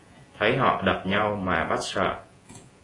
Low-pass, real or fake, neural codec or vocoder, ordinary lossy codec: 10.8 kHz; fake; vocoder, 48 kHz, 128 mel bands, Vocos; AAC, 32 kbps